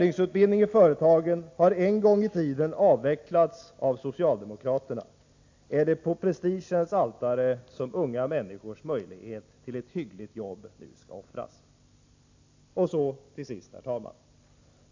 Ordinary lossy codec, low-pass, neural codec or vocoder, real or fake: AAC, 48 kbps; 7.2 kHz; none; real